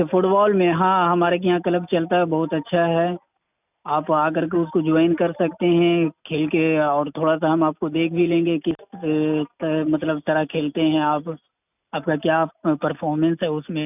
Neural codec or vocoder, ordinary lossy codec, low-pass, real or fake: none; none; 3.6 kHz; real